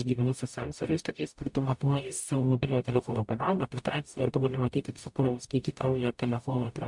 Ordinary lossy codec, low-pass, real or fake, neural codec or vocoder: MP3, 64 kbps; 10.8 kHz; fake; codec, 44.1 kHz, 0.9 kbps, DAC